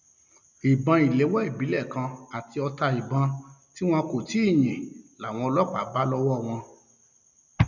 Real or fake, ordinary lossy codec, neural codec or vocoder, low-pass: real; none; none; 7.2 kHz